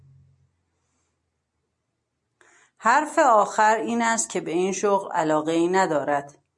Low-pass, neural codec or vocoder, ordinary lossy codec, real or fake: 10.8 kHz; none; MP3, 64 kbps; real